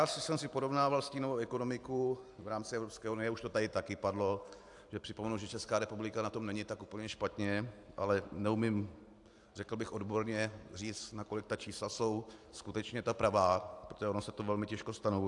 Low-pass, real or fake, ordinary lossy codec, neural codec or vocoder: 10.8 kHz; real; AAC, 64 kbps; none